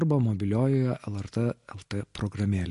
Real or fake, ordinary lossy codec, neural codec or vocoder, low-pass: real; MP3, 48 kbps; none; 14.4 kHz